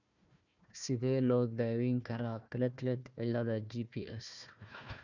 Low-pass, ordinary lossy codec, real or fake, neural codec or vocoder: 7.2 kHz; none; fake; codec, 16 kHz, 1 kbps, FunCodec, trained on Chinese and English, 50 frames a second